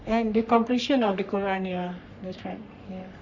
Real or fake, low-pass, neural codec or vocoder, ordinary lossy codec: fake; 7.2 kHz; codec, 44.1 kHz, 3.4 kbps, Pupu-Codec; none